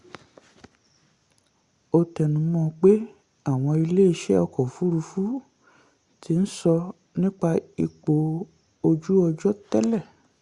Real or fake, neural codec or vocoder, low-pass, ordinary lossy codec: real; none; 10.8 kHz; Opus, 64 kbps